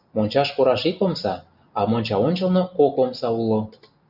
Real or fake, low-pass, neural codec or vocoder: real; 5.4 kHz; none